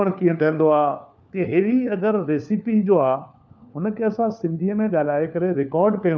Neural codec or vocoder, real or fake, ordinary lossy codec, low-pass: codec, 16 kHz, 4 kbps, FunCodec, trained on LibriTTS, 50 frames a second; fake; none; none